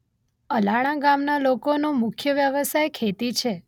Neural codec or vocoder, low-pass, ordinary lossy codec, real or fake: none; 19.8 kHz; none; real